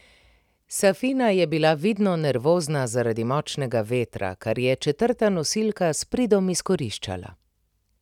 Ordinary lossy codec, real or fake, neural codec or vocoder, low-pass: none; real; none; 19.8 kHz